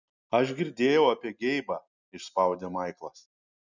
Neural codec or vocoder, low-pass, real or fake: none; 7.2 kHz; real